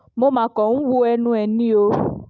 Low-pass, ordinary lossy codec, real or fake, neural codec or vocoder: none; none; real; none